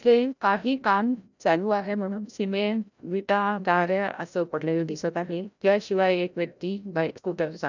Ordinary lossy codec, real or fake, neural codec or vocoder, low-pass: none; fake; codec, 16 kHz, 0.5 kbps, FreqCodec, larger model; 7.2 kHz